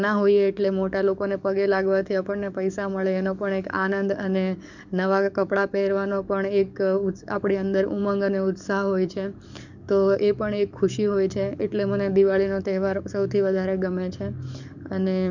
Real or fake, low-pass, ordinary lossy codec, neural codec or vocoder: fake; 7.2 kHz; none; codec, 44.1 kHz, 7.8 kbps, DAC